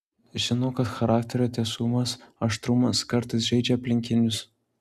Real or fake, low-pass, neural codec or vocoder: real; 14.4 kHz; none